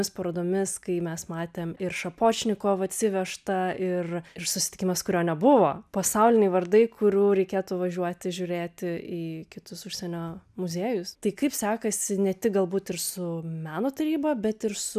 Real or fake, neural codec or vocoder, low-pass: real; none; 14.4 kHz